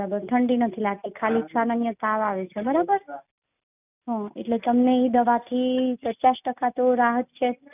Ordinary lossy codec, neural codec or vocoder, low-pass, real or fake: none; none; 3.6 kHz; real